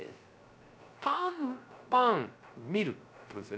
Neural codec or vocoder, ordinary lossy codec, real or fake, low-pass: codec, 16 kHz, 0.3 kbps, FocalCodec; none; fake; none